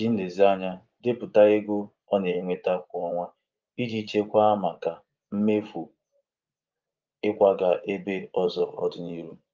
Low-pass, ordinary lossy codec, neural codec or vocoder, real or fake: 7.2 kHz; Opus, 24 kbps; vocoder, 24 kHz, 100 mel bands, Vocos; fake